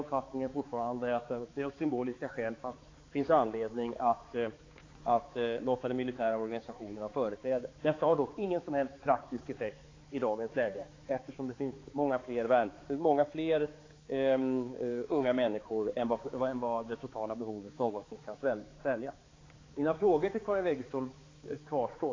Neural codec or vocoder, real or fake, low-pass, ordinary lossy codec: codec, 16 kHz, 4 kbps, X-Codec, HuBERT features, trained on balanced general audio; fake; 7.2 kHz; AAC, 32 kbps